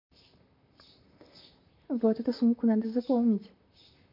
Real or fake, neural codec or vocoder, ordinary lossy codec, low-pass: fake; vocoder, 44.1 kHz, 128 mel bands, Pupu-Vocoder; MP3, 32 kbps; 5.4 kHz